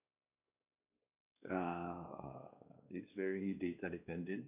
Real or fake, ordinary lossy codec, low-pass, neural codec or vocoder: fake; none; 3.6 kHz; codec, 16 kHz, 2 kbps, X-Codec, WavLM features, trained on Multilingual LibriSpeech